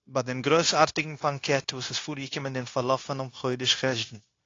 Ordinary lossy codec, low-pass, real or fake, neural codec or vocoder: AAC, 32 kbps; 7.2 kHz; fake; codec, 16 kHz, 0.9 kbps, LongCat-Audio-Codec